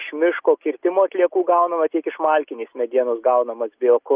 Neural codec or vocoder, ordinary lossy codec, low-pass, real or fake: none; Opus, 24 kbps; 3.6 kHz; real